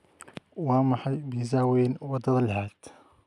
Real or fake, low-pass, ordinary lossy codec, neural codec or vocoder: real; none; none; none